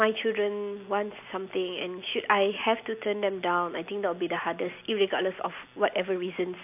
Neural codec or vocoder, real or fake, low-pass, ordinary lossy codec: none; real; 3.6 kHz; AAC, 32 kbps